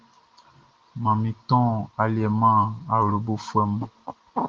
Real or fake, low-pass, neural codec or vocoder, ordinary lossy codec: real; 7.2 kHz; none; Opus, 16 kbps